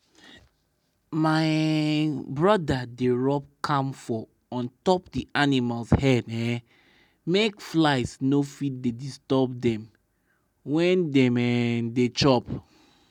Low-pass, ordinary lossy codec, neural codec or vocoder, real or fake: 19.8 kHz; none; none; real